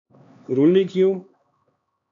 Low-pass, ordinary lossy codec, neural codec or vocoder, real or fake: 7.2 kHz; AAC, 48 kbps; codec, 16 kHz, 2 kbps, X-Codec, HuBERT features, trained on LibriSpeech; fake